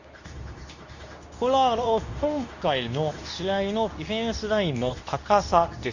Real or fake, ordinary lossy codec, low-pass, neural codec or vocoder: fake; none; 7.2 kHz; codec, 24 kHz, 0.9 kbps, WavTokenizer, medium speech release version 2